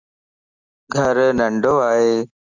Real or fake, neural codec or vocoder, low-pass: real; none; 7.2 kHz